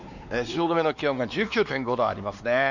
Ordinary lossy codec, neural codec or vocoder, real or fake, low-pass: none; codec, 16 kHz, 2 kbps, X-Codec, WavLM features, trained on Multilingual LibriSpeech; fake; 7.2 kHz